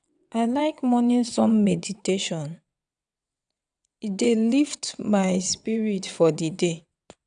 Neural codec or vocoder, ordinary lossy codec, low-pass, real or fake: vocoder, 22.05 kHz, 80 mel bands, WaveNeXt; none; 9.9 kHz; fake